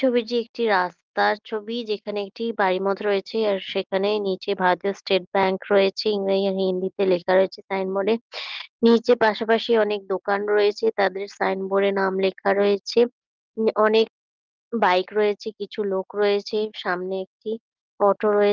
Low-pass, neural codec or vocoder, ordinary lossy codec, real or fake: 7.2 kHz; none; Opus, 32 kbps; real